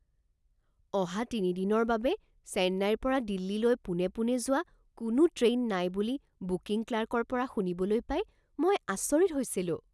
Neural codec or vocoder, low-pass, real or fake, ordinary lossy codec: none; none; real; none